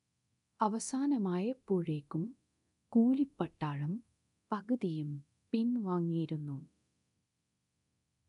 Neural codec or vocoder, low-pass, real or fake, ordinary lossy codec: codec, 24 kHz, 0.9 kbps, DualCodec; 10.8 kHz; fake; none